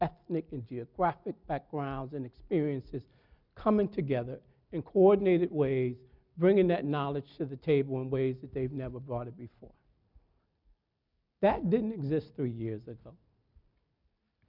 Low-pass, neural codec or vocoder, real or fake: 5.4 kHz; none; real